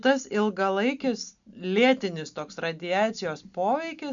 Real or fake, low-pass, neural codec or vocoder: real; 7.2 kHz; none